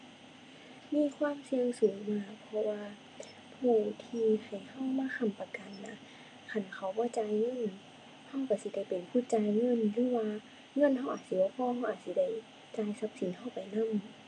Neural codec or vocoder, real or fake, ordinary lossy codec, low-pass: none; real; none; 9.9 kHz